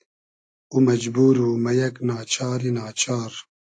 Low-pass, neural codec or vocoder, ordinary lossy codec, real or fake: 9.9 kHz; none; AAC, 48 kbps; real